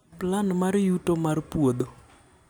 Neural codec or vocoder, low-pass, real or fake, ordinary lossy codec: none; none; real; none